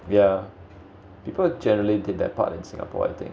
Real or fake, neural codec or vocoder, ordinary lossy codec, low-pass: real; none; none; none